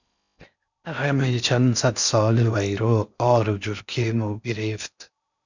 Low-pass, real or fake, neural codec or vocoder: 7.2 kHz; fake; codec, 16 kHz in and 24 kHz out, 0.6 kbps, FocalCodec, streaming, 4096 codes